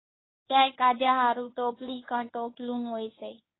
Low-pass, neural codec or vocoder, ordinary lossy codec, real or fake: 7.2 kHz; codec, 24 kHz, 0.9 kbps, WavTokenizer, medium speech release version 1; AAC, 16 kbps; fake